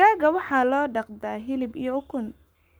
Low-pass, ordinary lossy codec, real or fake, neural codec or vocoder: none; none; fake; codec, 44.1 kHz, 7.8 kbps, Pupu-Codec